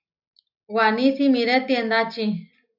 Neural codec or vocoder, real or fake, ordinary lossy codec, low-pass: none; real; AAC, 48 kbps; 5.4 kHz